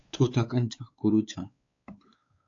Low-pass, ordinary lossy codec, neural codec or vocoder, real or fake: 7.2 kHz; AAC, 48 kbps; codec, 16 kHz, 4 kbps, X-Codec, WavLM features, trained on Multilingual LibriSpeech; fake